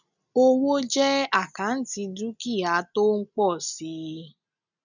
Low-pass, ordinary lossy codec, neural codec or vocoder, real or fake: 7.2 kHz; none; none; real